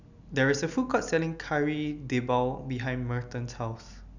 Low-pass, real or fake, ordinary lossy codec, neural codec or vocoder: 7.2 kHz; real; none; none